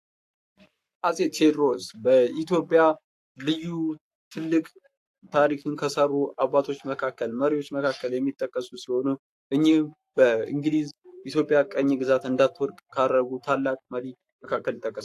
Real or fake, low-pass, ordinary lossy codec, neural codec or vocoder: fake; 14.4 kHz; AAC, 64 kbps; codec, 44.1 kHz, 7.8 kbps, Pupu-Codec